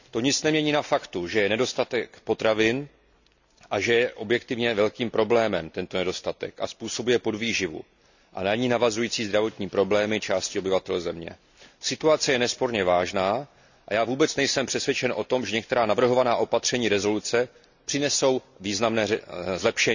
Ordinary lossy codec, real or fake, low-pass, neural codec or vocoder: none; real; 7.2 kHz; none